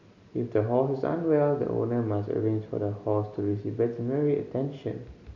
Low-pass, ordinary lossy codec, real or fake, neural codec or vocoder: 7.2 kHz; none; real; none